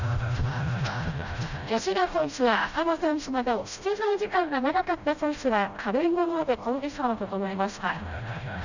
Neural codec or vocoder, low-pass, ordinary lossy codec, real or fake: codec, 16 kHz, 0.5 kbps, FreqCodec, smaller model; 7.2 kHz; none; fake